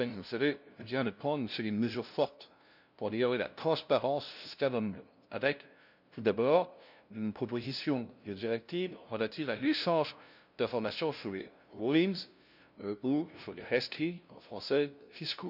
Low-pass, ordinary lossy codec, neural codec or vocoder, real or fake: 5.4 kHz; none; codec, 16 kHz, 0.5 kbps, FunCodec, trained on LibriTTS, 25 frames a second; fake